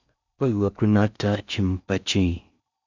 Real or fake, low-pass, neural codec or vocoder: fake; 7.2 kHz; codec, 16 kHz in and 24 kHz out, 0.6 kbps, FocalCodec, streaming, 4096 codes